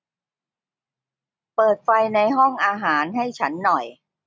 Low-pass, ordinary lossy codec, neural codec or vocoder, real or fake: none; none; none; real